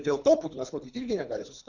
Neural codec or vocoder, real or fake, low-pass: codec, 24 kHz, 3 kbps, HILCodec; fake; 7.2 kHz